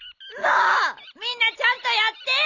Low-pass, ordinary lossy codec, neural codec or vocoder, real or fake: 7.2 kHz; none; none; real